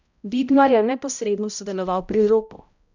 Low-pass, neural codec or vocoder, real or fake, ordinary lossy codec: 7.2 kHz; codec, 16 kHz, 0.5 kbps, X-Codec, HuBERT features, trained on balanced general audio; fake; none